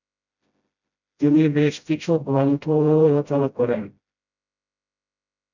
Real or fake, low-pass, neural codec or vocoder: fake; 7.2 kHz; codec, 16 kHz, 0.5 kbps, FreqCodec, smaller model